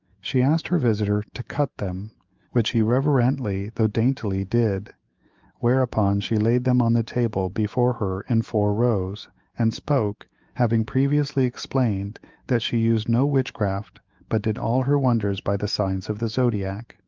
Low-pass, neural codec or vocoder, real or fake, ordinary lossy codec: 7.2 kHz; autoencoder, 48 kHz, 128 numbers a frame, DAC-VAE, trained on Japanese speech; fake; Opus, 24 kbps